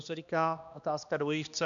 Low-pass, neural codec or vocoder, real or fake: 7.2 kHz; codec, 16 kHz, 1 kbps, X-Codec, HuBERT features, trained on balanced general audio; fake